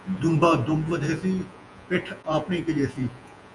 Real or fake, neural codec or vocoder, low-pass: fake; vocoder, 48 kHz, 128 mel bands, Vocos; 10.8 kHz